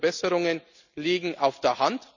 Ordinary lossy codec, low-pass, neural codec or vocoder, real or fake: none; 7.2 kHz; none; real